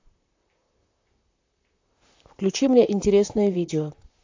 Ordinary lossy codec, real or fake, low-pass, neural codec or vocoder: AAC, 48 kbps; fake; 7.2 kHz; vocoder, 44.1 kHz, 128 mel bands, Pupu-Vocoder